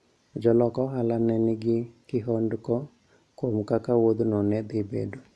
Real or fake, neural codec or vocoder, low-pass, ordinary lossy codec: real; none; none; none